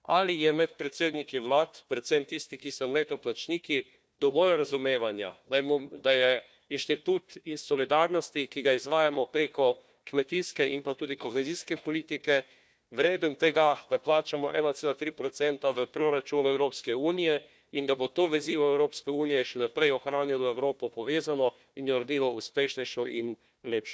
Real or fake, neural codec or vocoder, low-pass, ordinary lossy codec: fake; codec, 16 kHz, 1 kbps, FunCodec, trained on Chinese and English, 50 frames a second; none; none